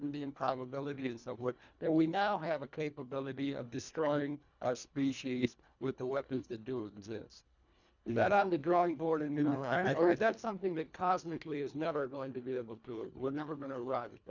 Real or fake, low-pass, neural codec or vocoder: fake; 7.2 kHz; codec, 24 kHz, 1.5 kbps, HILCodec